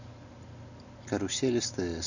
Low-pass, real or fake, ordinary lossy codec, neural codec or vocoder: 7.2 kHz; real; none; none